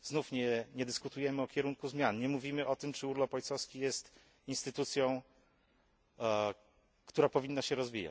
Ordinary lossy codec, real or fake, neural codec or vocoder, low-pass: none; real; none; none